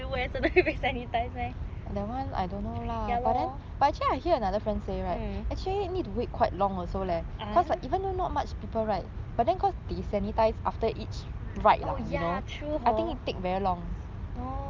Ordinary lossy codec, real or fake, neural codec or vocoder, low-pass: Opus, 24 kbps; real; none; 7.2 kHz